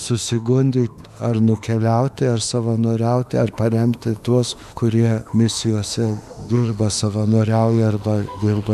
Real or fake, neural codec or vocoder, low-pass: fake; autoencoder, 48 kHz, 32 numbers a frame, DAC-VAE, trained on Japanese speech; 14.4 kHz